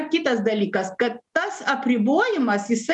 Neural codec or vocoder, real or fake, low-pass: none; real; 10.8 kHz